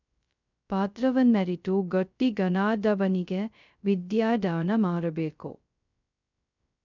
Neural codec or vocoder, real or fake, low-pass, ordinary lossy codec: codec, 16 kHz, 0.2 kbps, FocalCodec; fake; 7.2 kHz; none